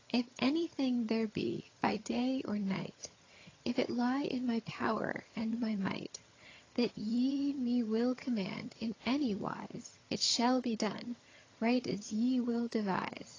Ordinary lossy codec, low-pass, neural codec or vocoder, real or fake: AAC, 32 kbps; 7.2 kHz; vocoder, 22.05 kHz, 80 mel bands, HiFi-GAN; fake